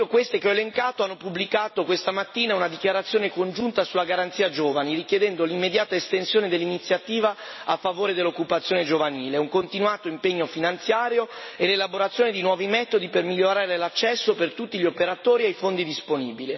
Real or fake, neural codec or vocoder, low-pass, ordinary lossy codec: real; none; 7.2 kHz; MP3, 24 kbps